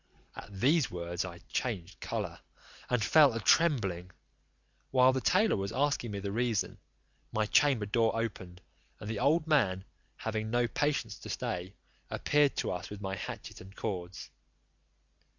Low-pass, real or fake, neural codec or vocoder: 7.2 kHz; real; none